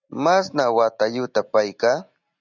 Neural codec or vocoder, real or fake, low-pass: none; real; 7.2 kHz